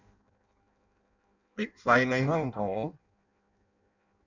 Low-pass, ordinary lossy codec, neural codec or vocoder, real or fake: 7.2 kHz; none; codec, 16 kHz in and 24 kHz out, 0.6 kbps, FireRedTTS-2 codec; fake